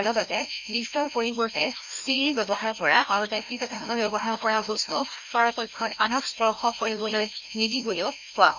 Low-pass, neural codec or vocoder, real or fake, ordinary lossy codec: 7.2 kHz; codec, 16 kHz, 1 kbps, FreqCodec, larger model; fake; none